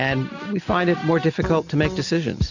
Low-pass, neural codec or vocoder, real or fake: 7.2 kHz; none; real